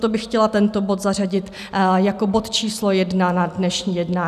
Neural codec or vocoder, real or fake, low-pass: none; real; 14.4 kHz